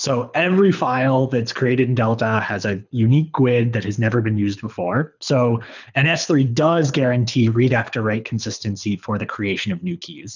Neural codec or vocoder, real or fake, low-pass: codec, 24 kHz, 6 kbps, HILCodec; fake; 7.2 kHz